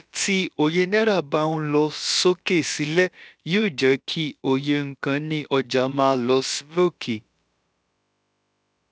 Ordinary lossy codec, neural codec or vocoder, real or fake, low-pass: none; codec, 16 kHz, about 1 kbps, DyCAST, with the encoder's durations; fake; none